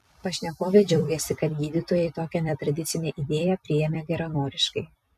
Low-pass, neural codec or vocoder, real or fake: 14.4 kHz; vocoder, 44.1 kHz, 128 mel bands every 256 samples, BigVGAN v2; fake